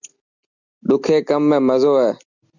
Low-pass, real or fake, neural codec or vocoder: 7.2 kHz; real; none